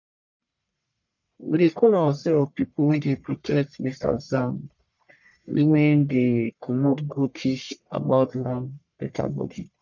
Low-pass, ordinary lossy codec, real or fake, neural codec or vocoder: 7.2 kHz; none; fake; codec, 44.1 kHz, 1.7 kbps, Pupu-Codec